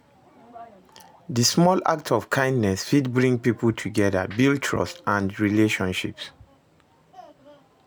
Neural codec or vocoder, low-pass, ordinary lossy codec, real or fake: none; none; none; real